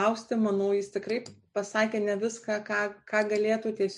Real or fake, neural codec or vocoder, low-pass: real; none; 10.8 kHz